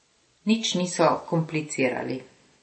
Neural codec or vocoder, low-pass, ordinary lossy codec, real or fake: none; 9.9 kHz; MP3, 32 kbps; real